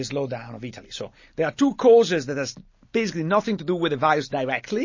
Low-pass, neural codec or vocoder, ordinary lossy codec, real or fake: 7.2 kHz; none; MP3, 32 kbps; real